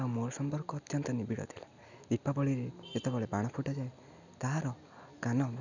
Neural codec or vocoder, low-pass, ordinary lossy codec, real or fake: none; 7.2 kHz; none; real